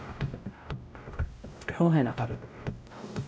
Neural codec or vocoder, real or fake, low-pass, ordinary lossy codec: codec, 16 kHz, 0.5 kbps, X-Codec, WavLM features, trained on Multilingual LibriSpeech; fake; none; none